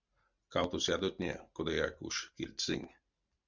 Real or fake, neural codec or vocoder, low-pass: real; none; 7.2 kHz